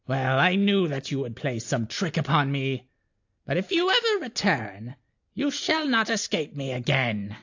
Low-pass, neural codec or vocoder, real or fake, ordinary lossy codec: 7.2 kHz; none; real; AAC, 48 kbps